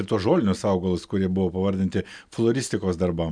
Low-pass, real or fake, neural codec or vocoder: 9.9 kHz; real; none